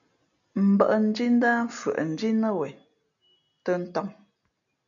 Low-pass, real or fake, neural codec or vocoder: 7.2 kHz; real; none